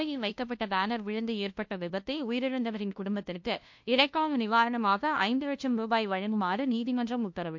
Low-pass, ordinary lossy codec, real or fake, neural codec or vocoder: 7.2 kHz; MP3, 48 kbps; fake; codec, 16 kHz, 0.5 kbps, FunCodec, trained on LibriTTS, 25 frames a second